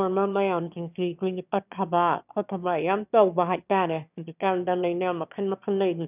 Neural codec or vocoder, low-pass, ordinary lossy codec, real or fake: autoencoder, 22.05 kHz, a latent of 192 numbers a frame, VITS, trained on one speaker; 3.6 kHz; none; fake